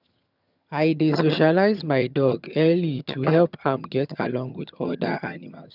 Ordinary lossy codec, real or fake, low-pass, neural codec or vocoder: none; fake; 5.4 kHz; vocoder, 22.05 kHz, 80 mel bands, HiFi-GAN